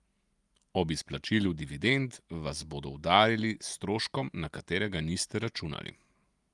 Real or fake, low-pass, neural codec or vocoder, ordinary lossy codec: real; 10.8 kHz; none; Opus, 32 kbps